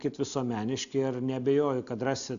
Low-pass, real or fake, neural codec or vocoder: 7.2 kHz; real; none